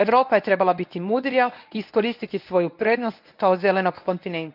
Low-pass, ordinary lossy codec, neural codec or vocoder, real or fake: 5.4 kHz; none; codec, 24 kHz, 0.9 kbps, WavTokenizer, medium speech release version 1; fake